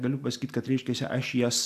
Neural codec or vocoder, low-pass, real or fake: none; 14.4 kHz; real